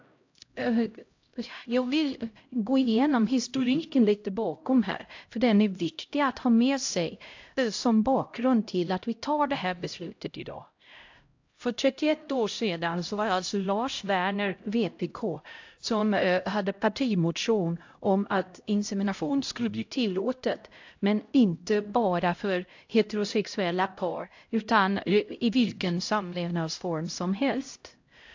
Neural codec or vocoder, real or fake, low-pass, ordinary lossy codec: codec, 16 kHz, 0.5 kbps, X-Codec, HuBERT features, trained on LibriSpeech; fake; 7.2 kHz; AAC, 48 kbps